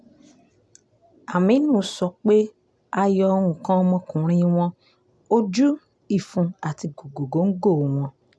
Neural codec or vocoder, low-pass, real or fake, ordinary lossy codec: none; none; real; none